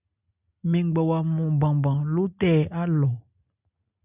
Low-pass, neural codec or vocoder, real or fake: 3.6 kHz; none; real